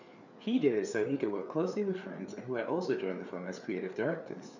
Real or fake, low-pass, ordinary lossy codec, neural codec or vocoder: fake; 7.2 kHz; none; codec, 16 kHz, 4 kbps, FreqCodec, larger model